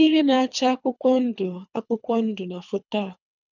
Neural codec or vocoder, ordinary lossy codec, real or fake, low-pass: codec, 24 kHz, 3 kbps, HILCodec; none; fake; 7.2 kHz